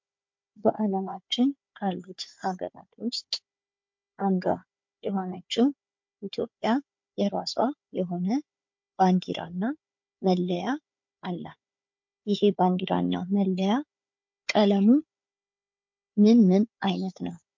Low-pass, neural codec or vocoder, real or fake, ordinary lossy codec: 7.2 kHz; codec, 16 kHz, 4 kbps, FunCodec, trained on Chinese and English, 50 frames a second; fake; MP3, 48 kbps